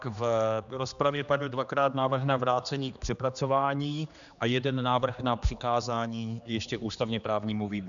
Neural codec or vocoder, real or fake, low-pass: codec, 16 kHz, 2 kbps, X-Codec, HuBERT features, trained on general audio; fake; 7.2 kHz